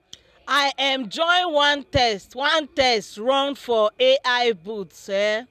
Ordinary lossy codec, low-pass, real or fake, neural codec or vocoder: none; 14.4 kHz; real; none